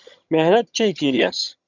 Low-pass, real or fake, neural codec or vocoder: 7.2 kHz; fake; vocoder, 22.05 kHz, 80 mel bands, HiFi-GAN